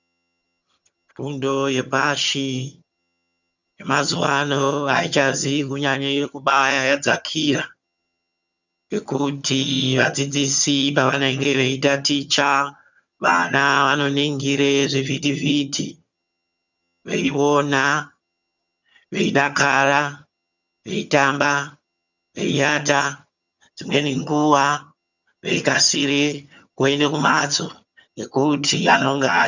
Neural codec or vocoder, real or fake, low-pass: vocoder, 22.05 kHz, 80 mel bands, HiFi-GAN; fake; 7.2 kHz